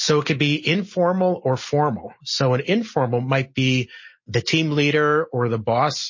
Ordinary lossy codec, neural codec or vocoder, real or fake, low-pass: MP3, 32 kbps; none; real; 7.2 kHz